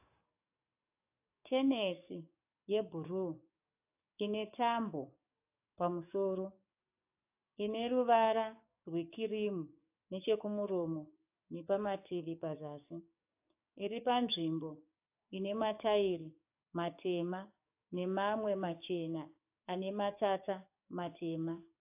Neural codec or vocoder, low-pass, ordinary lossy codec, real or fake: codec, 44.1 kHz, 7.8 kbps, DAC; 3.6 kHz; AAC, 32 kbps; fake